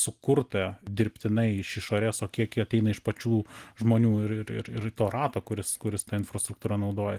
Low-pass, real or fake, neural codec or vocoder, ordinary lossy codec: 14.4 kHz; real; none; Opus, 24 kbps